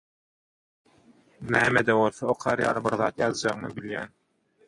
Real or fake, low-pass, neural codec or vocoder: fake; 10.8 kHz; vocoder, 24 kHz, 100 mel bands, Vocos